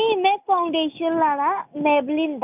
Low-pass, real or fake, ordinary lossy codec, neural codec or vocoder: 3.6 kHz; real; none; none